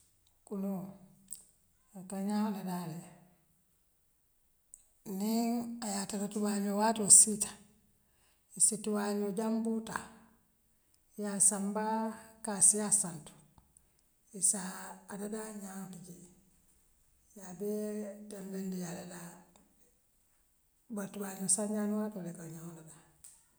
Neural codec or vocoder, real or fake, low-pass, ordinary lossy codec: none; real; none; none